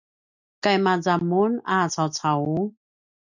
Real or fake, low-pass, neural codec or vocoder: real; 7.2 kHz; none